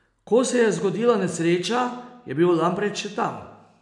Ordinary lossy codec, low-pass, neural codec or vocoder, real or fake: none; 10.8 kHz; none; real